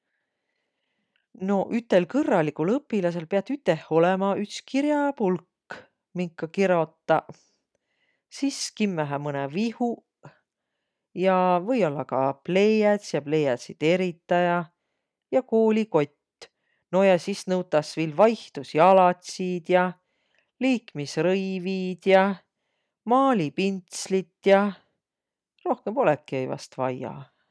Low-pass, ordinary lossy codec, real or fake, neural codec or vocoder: none; none; real; none